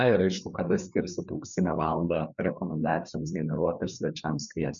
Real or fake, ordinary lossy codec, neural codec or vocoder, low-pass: fake; MP3, 96 kbps; codec, 16 kHz, 4 kbps, FreqCodec, larger model; 7.2 kHz